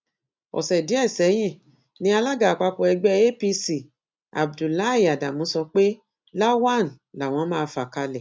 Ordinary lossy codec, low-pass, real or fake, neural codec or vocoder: none; none; real; none